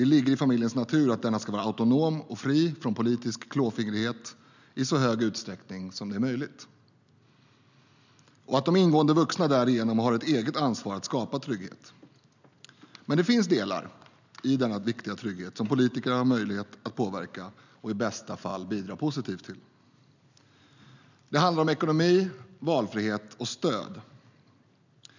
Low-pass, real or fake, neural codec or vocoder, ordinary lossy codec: 7.2 kHz; real; none; none